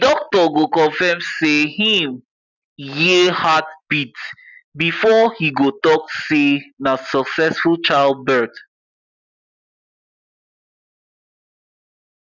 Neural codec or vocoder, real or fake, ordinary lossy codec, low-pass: none; real; none; 7.2 kHz